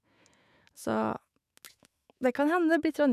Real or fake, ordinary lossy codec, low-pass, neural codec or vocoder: fake; none; 14.4 kHz; autoencoder, 48 kHz, 128 numbers a frame, DAC-VAE, trained on Japanese speech